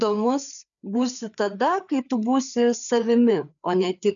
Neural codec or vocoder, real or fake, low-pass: codec, 16 kHz, 4 kbps, FreqCodec, larger model; fake; 7.2 kHz